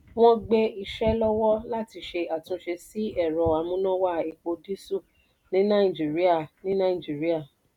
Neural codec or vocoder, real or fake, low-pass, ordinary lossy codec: none; real; 19.8 kHz; none